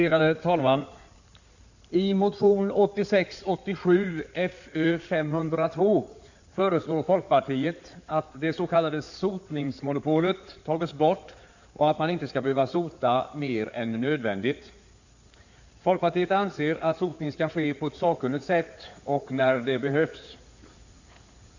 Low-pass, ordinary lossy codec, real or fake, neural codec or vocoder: 7.2 kHz; none; fake; codec, 16 kHz in and 24 kHz out, 2.2 kbps, FireRedTTS-2 codec